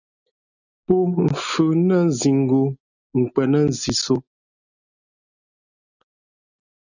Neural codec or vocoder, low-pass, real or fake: none; 7.2 kHz; real